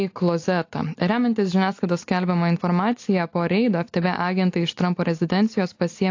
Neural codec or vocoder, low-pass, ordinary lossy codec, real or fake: none; 7.2 kHz; AAC, 48 kbps; real